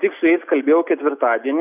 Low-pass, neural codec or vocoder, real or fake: 3.6 kHz; none; real